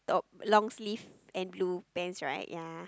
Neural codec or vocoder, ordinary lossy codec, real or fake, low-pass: none; none; real; none